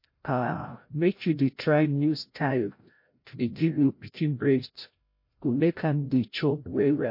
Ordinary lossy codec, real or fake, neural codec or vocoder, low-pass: MP3, 32 kbps; fake; codec, 16 kHz, 0.5 kbps, FreqCodec, larger model; 5.4 kHz